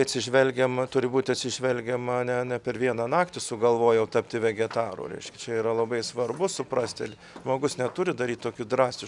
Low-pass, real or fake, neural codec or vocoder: 10.8 kHz; real; none